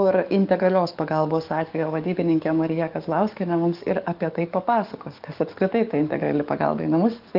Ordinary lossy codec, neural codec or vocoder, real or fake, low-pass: Opus, 24 kbps; codec, 44.1 kHz, 7.8 kbps, DAC; fake; 5.4 kHz